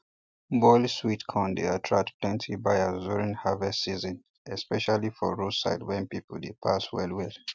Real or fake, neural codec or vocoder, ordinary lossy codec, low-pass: real; none; none; none